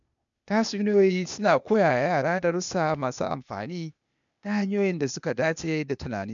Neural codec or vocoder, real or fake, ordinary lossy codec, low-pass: codec, 16 kHz, 0.8 kbps, ZipCodec; fake; MP3, 96 kbps; 7.2 kHz